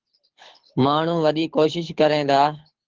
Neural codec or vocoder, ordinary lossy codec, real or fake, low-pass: codec, 24 kHz, 6 kbps, HILCodec; Opus, 16 kbps; fake; 7.2 kHz